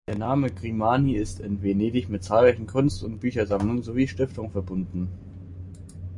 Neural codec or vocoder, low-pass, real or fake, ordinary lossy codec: none; 10.8 kHz; real; MP3, 48 kbps